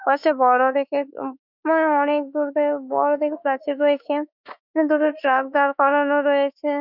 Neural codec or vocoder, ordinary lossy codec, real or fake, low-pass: autoencoder, 48 kHz, 32 numbers a frame, DAC-VAE, trained on Japanese speech; none; fake; 5.4 kHz